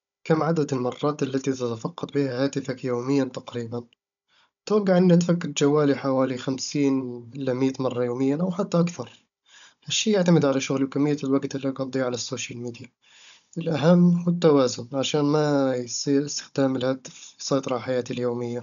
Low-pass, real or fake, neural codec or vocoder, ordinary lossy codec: 7.2 kHz; fake; codec, 16 kHz, 16 kbps, FunCodec, trained on Chinese and English, 50 frames a second; none